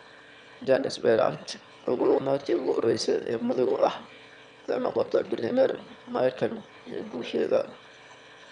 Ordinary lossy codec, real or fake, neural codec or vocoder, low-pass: none; fake; autoencoder, 22.05 kHz, a latent of 192 numbers a frame, VITS, trained on one speaker; 9.9 kHz